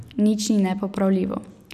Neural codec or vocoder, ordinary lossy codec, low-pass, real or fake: none; none; 14.4 kHz; real